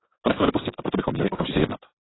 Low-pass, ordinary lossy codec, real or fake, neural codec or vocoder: 7.2 kHz; AAC, 16 kbps; real; none